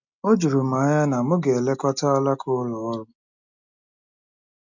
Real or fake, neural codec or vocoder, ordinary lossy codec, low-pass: real; none; none; 7.2 kHz